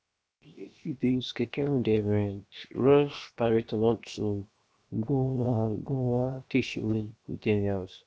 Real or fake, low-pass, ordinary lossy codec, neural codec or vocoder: fake; none; none; codec, 16 kHz, 0.7 kbps, FocalCodec